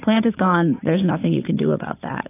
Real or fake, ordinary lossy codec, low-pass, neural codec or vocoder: fake; AAC, 24 kbps; 3.6 kHz; vocoder, 44.1 kHz, 80 mel bands, Vocos